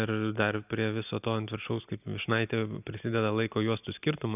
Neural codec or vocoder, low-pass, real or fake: none; 3.6 kHz; real